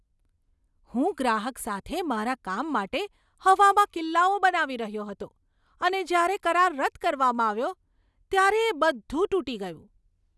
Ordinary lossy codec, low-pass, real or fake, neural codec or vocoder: none; none; real; none